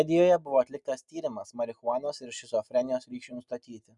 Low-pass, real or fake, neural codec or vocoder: 10.8 kHz; real; none